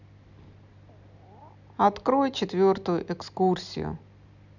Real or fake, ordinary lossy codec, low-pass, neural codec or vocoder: real; none; 7.2 kHz; none